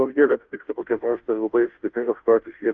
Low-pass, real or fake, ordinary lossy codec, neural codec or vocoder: 7.2 kHz; fake; Opus, 24 kbps; codec, 16 kHz, 0.5 kbps, FunCodec, trained on Chinese and English, 25 frames a second